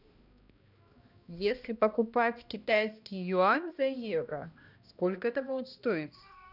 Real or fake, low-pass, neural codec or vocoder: fake; 5.4 kHz; codec, 16 kHz, 1 kbps, X-Codec, HuBERT features, trained on balanced general audio